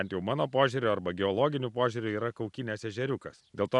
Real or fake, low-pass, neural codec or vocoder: real; 10.8 kHz; none